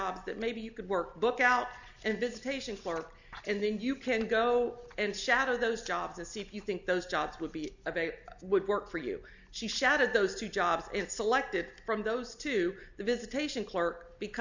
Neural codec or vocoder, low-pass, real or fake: none; 7.2 kHz; real